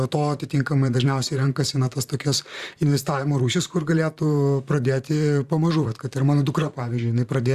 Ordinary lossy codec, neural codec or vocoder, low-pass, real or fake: Opus, 64 kbps; vocoder, 44.1 kHz, 128 mel bands, Pupu-Vocoder; 14.4 kHz; fake